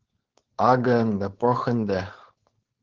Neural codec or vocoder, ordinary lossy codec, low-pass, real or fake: codec, 16 kHz, 4.8 kbps, FACodec; Opus, 16 kbps; 7.2 kHz; fake